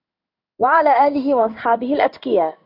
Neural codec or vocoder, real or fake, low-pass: codec, 16 kHz in and 24 kHz out, 1 kbps, XY-Tokenizer; fake; 5.4 kHz